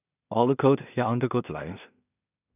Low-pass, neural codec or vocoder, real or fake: 3.6 kHz; codec, 16 kHz in and 24 kHz out, 0.4 kbps, LongCat-Audio-Codec, two codebook decoder; fake